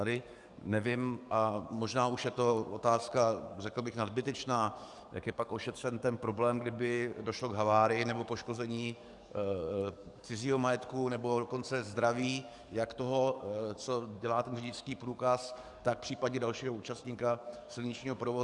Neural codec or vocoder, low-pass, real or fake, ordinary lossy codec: codec, 44.1 kHz, 7.8 kbps, DAC; 10.8 kHz; fake; Opus, 64 kbps